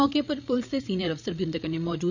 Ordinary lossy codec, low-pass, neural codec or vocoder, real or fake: none; 7.2 kHz; codec, 16 kHz, 8 kbps, FreqCodec, larger model; fake